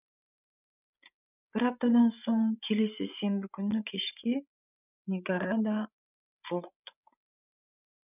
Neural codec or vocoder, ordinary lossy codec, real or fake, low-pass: codec, 16 kHz, 16 kbps, FreqCodec, larger model; none; fake; 3.6 kHz